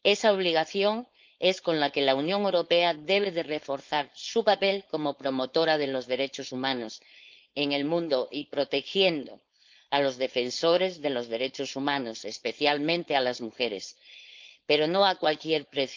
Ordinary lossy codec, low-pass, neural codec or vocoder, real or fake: Opus, 24 kbps; 7.2 kHz; codec, 16 kHz, 4.8 kbps, FACodec; fake